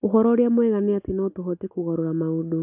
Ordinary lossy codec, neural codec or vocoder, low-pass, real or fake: AAC, 32 kbps; none; 3.6 kHz; real